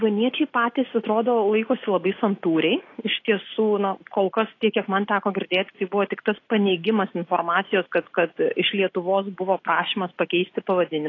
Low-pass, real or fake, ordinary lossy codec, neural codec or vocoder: 7.2 kHz; real; AAC, 32 kbps; none